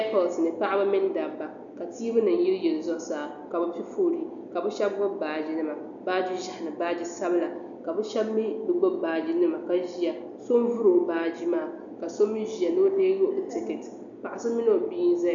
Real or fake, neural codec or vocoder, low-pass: real; none; 7.2 kHz